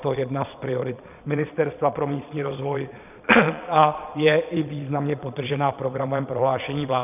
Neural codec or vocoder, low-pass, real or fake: vocoder, 22.05 kHz, 80 mel bands, Vocos; 3.6 kHz; fake